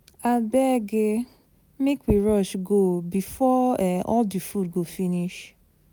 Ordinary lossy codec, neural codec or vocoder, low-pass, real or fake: none; none; none; real